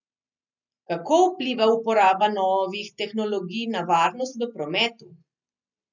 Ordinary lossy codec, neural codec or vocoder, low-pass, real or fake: none; none; 7.2 kHz; real